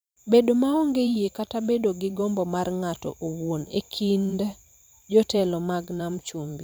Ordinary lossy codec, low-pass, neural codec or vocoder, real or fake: none; none; vocoder, 44.1 kHz, 128 mel bands every 512 samples, BigVGAN v2; fake